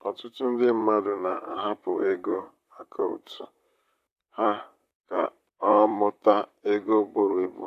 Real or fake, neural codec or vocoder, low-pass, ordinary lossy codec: fake; vocoder, 44.1 kHz, 128 mel bands, Pupu-Vocoder; 14.4 kHz; AAC, 64 kbps